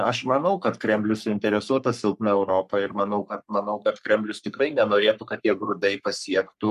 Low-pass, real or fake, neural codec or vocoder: 14.4 kHz; fake; codec, 44.1 kHz, 3.4 kbps, Pupu-Codec